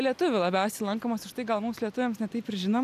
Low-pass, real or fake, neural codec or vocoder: 14.4 kHz; real; none